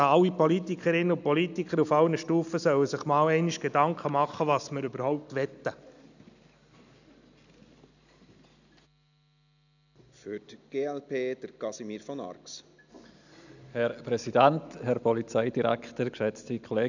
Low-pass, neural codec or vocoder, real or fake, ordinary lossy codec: 7.2 kHz; none; real; none